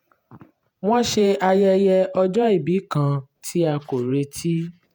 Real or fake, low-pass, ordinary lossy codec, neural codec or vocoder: fake; none; none; vocoder, 48 kHz, 128 mel bands, Vocos